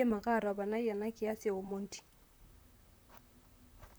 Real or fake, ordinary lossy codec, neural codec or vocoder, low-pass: fake; none; vocoder, 44.1 kHz, 128 mel bands, Pupu-Vocoder; none